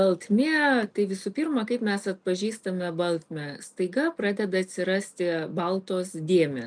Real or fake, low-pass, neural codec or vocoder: real; 9.9 kHz; none